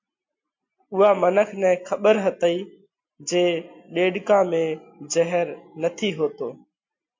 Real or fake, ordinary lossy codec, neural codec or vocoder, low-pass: real; MP3, 48 kbps; none; 7.2 kHz